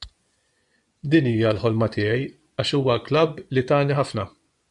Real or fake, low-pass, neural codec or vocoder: fake; 10.8 kHz; vocoder, 24 kHz, 100 mel bands, Vocos